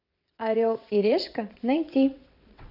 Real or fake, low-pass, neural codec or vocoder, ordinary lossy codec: fake; 5.4 kHz; vocoder, 22.05 kHz, 80 mel bands, WaveNeXt; none